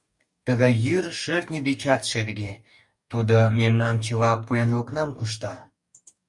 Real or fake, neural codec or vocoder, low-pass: fake; codec, 44.1 kHz, 2.6 kbps, DAC; 10.8 kHz